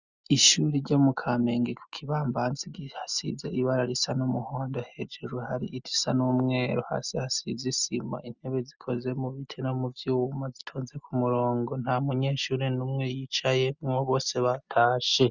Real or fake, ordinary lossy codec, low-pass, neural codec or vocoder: real; Opus, 64 kbps; 7.2 kHz; none